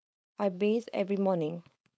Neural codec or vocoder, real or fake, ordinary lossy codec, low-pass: codec, 16 kHz, 4.8 kbps, FACodec; fake; none; none